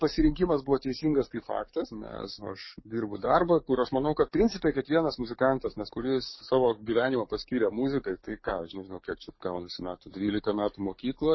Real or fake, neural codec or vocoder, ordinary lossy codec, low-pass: fake; codec, 44.1 kHz, 7.8 kbps, DAC; MP3, 24 kbps; 7.2 kHz